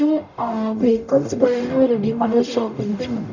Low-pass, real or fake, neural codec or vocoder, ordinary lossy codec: 7.2 kHz; fake; codec, 44.1 kHz, 0.9 kbps, DAC; none